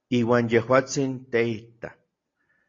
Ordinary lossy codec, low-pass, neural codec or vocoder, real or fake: AAC, 32 kbps; 7.2 kHz; none; real